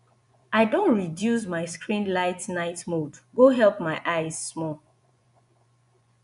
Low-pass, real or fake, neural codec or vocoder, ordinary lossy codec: 10.8 kHz; fake; vocoder, 24 kHz, 100 mel bands, Vocos; none